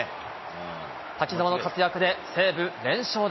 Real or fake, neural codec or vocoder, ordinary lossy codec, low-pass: real; none; MP3, 24 kbps; 7.2 kHz